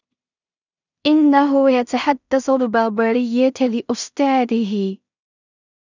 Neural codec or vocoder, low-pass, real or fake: codec, 16 kHz in and 24 kHz out, 0.4 kbps, LongCat-Audio-Codec, two codebook decoder; 7.2 kHz; fake